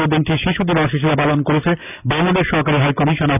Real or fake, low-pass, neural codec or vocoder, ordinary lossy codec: real; 3.6 kHz; none; none